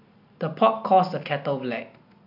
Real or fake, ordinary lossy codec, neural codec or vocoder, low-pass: real; MP3, 48 kbps; none; 5.4 kHz